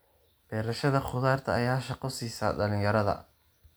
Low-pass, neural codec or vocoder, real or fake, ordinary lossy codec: none; none; real; none